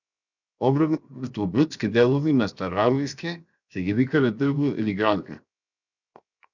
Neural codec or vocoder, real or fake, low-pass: codec, 16 kHz, 0.7 kbps, FocalCodec; fake; 7.2 kHz